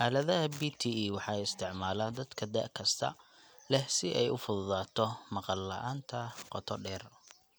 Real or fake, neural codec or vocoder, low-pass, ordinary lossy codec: real; none; none; none